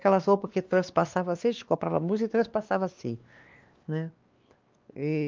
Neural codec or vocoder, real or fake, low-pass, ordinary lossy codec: codec, 16 kHz, 2 kbps, X-Codec, WavLM features, trained on Multilingual LibriSpeech; fake; 7.2 kHz; Opus, 24 kbps